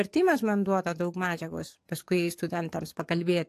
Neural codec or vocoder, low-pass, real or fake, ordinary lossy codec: codec, 44.1 kHz, 7.8 kbps, DAC; 14.4 kHz; fake; AAC, 64 kbps